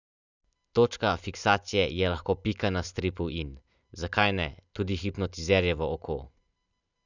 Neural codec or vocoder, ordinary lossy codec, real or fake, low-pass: none; none; real; 7.2 kHz